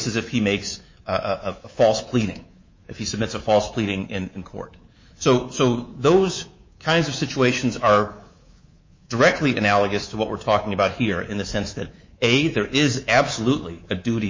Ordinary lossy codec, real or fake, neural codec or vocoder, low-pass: MP3, 32 kbps; fake; codec, 24 kHz, 3.1 kbps, DualCodec; 7.2 kHz